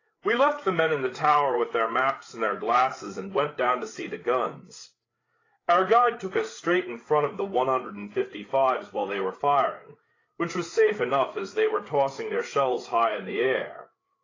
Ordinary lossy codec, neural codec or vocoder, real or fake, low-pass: AAC, 32 kbps; codec, 16 kHz, 8 kbps, FreqCodec, larger model; fake; 7.2 kHz